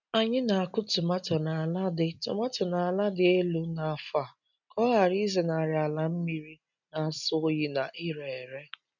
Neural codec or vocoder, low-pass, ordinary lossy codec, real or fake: none; 7.2 kHz; none; real